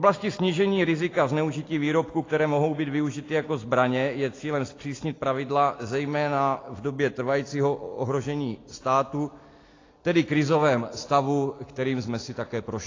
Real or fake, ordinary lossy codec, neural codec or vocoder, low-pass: real; AAC, 32 kbps; none; 7.2 kHz